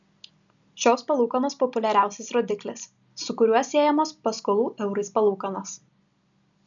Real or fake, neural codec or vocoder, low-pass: real; none; 7.2 kHz